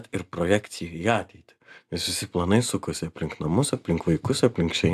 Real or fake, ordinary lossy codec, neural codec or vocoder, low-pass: real; AAC, 96 kbps; none; 14.4 kHz